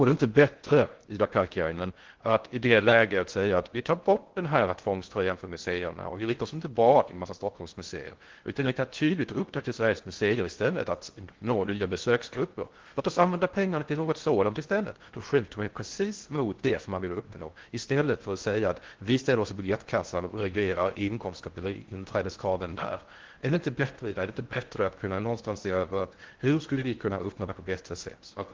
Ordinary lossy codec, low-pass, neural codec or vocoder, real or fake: Opus, 16 kbps; 7.2 kHz; codec, 16 kHz in and 24 kHz out, 0.6 kbps, FocalCodec, streaming, 2048 codes; fake